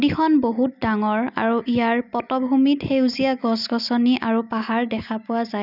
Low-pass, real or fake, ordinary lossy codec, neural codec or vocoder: 5.4 kHz; real; none; none